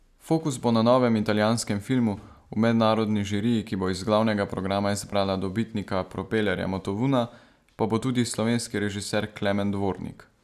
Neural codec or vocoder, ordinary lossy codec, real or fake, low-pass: none; none; real; 14.4 kHz